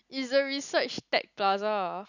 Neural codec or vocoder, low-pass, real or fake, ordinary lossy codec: none; 7.2 kHz; real; none